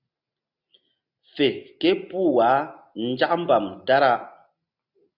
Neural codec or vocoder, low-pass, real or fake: none; 5.4 kHz; real